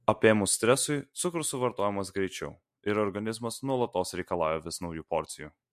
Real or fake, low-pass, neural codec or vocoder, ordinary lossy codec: real; 14.4 kHz; none; MP3, 64 kbps